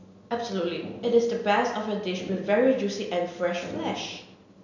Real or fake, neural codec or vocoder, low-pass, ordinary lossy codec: real; none; 7.2 kHz; none